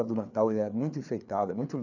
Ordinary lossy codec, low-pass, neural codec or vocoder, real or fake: none; 7.2 kHz; codec, 16 kHz, 4 kbps, FreqCodec, larger model; fake